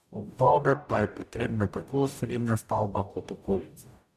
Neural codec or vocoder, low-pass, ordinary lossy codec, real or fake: codec, 44.1 kHz, 0.9 kbps, DAC; 14.4 kHz; none; fake